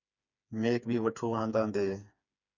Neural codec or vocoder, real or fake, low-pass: codec, 16 kHz, 4 kbps, FreqCodec, smaller model; fake; 7.2 kHz